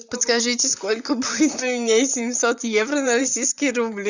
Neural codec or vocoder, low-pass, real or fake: vocoder, 44.1 kHz, 128 mel bands, Pupu-Vocoder; 7.2 kHz; fake